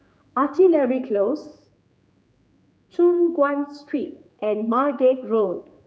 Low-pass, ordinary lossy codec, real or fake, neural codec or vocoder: none; none; fake; codec, 16 kHz, 4 kbps, X-Codec, HuBERT features, trained on general audio